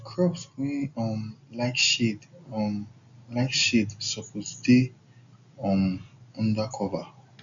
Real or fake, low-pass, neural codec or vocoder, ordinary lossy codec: real; 7.2 kHz; none; none